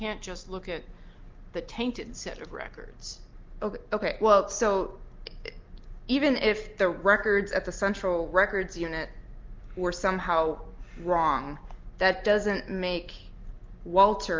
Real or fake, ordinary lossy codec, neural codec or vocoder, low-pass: real; Opus, 32 kbps; none; 7.2 kHz